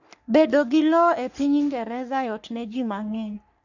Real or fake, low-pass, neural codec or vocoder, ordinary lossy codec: fake; 7.2 kHz; codec, 44.1 kHz, 3.4 kbps, Pupu-Codec; none